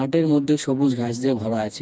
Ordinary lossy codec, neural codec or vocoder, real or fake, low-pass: none; codec, 16 kHz, 2 kbps, FreqCodec, smaller model; fake; none